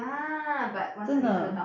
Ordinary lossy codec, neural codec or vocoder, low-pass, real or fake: none; none; 7.2 kHz; real